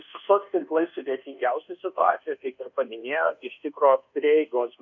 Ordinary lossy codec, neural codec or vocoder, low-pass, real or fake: AAC, 48 kbps; codec, 24 kHz, 1.2 kbps, DualCodec; 7.2 kHz; fake